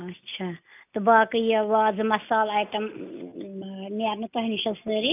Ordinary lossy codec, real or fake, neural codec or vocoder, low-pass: none; real; none; 3.6 kHz